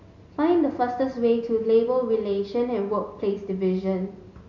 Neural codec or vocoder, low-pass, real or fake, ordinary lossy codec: none; 7.2 kHz; real; none